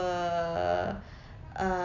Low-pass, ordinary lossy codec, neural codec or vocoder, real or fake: 7.2 kHz; none; none; real